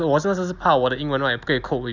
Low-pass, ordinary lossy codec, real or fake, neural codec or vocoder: 7.2 kHz; none; real; none